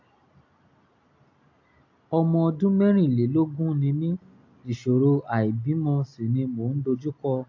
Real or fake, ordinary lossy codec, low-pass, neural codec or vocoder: real; AAC, 48 kbps; 7.2 kHz; none